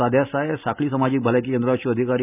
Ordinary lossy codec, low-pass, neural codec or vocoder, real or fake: none; 3.6 kHz; none; real